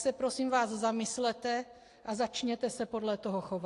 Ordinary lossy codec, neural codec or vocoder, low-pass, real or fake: AAC, 48 kbps; none; 10.8 kHz; real